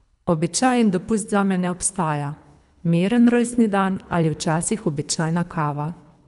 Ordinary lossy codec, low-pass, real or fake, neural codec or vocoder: none; 10.8 kHz; fake; codec, 24 kHz, 3 kbps, HILCodec